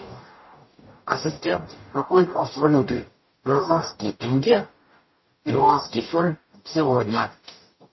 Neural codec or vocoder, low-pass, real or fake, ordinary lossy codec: codec, 44.1 kHz, 0.9 kbps, DAC; 7.2 kHz; fake; MP3, 24 kbps